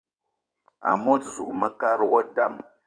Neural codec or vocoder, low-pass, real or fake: codec, 16 kHz in and 24 kHz out, 2.2 kbps, FireRedTTS-2 codec; 9.9 kHz; fake